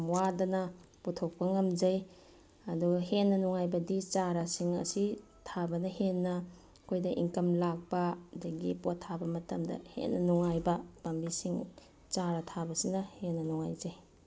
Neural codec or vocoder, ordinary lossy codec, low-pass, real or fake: none; none; none; real